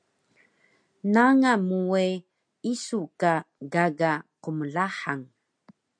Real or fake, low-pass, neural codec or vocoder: real; 9.9 kHz; none